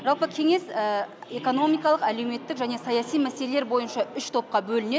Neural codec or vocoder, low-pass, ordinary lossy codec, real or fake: none; none; none; real